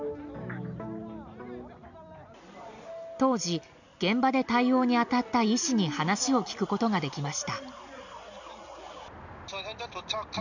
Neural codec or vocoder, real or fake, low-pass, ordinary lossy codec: none; real; 7.2 kHz; none